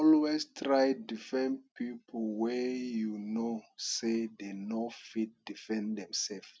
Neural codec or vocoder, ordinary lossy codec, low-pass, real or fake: none; none; none; real